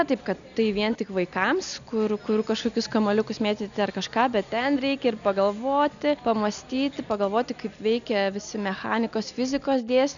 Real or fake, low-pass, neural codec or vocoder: real; 7.2 kHz; none